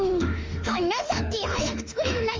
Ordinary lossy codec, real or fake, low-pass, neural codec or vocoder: Opus, 32 kbps; fake; 7.2 kHz; autoencoder, 48 kHz, 32 numbers a frame, DAC-VAE, trained on Japanese speech